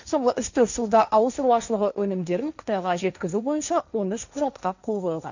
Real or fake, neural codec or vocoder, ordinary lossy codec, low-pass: fake; codec, 16 kHz, 1.1 kbps, Voila-Tokenizer; none; 7.2 kHz